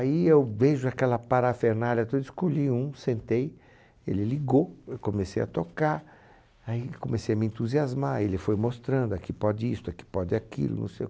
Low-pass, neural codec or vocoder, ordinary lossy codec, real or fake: none; none; none; real